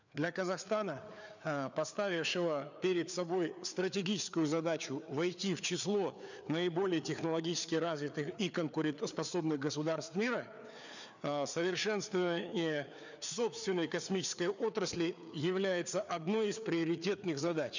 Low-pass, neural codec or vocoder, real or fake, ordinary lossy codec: 7.2 kHz; codec, 16 kHz, 4 kbps, FreqCodec, larger model; fake; none